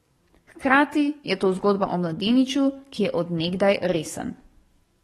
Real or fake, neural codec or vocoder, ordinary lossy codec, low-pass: fake; codec, 44.1 kHz, 7.8 kbps, DAC; AAC, 32 kbps; 19.8 kHz